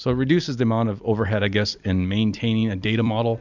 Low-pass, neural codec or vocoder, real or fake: 7.2 kHz; vocoder, 44.1 kHz, 128 mel bands every 256 samples, BigVGAN v2; fake